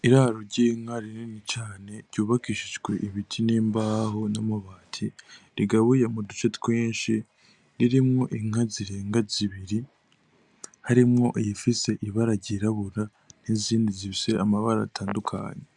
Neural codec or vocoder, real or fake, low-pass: none; real; 10.8 kHz